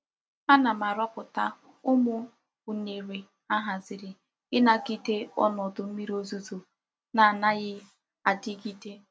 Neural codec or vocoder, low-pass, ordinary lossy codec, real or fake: none; none; none; real